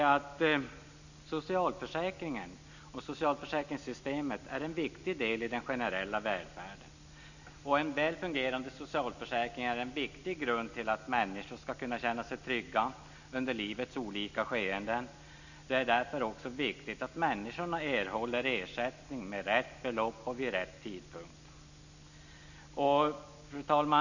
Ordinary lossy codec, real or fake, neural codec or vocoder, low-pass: none; real; none; 7.2 kHz